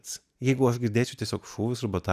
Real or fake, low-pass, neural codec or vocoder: real; 14.4 kHz; none